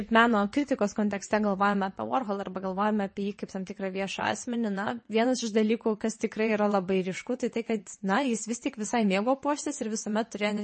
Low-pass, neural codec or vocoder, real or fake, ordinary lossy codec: 9.9 kHz; vocoder, 22.05 kHz, 80 mel bands, WaveNeXt; fake; MP3, 32 kbps